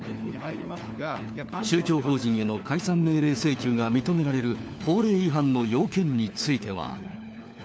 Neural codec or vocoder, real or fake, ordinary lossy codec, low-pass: codec, 16 kHz, 4 kbps, FunCodec, trained on LibriTTS, 50 frames a second; fake; none; none